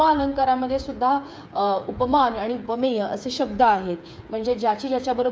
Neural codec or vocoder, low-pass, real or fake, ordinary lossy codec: codec, 16 kHz, 8 kbps, FreqCodec, smaller model; none; fake; none